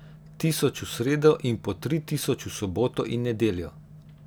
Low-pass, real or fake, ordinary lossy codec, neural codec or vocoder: none; real; none; none